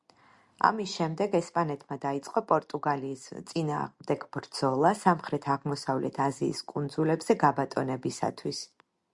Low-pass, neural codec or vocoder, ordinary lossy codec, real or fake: 10.8 kHz; none; Opus, 64 kbps; real